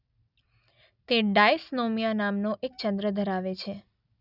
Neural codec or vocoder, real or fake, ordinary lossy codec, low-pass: none; real; none; 5.4 kHz